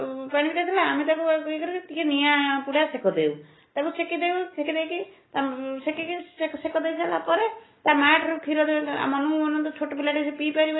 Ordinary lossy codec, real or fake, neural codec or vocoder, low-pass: AAC, 16 kbps; real; none; 7.2 kHz